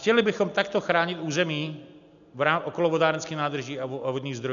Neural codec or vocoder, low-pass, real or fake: none; 7.2 kHz; real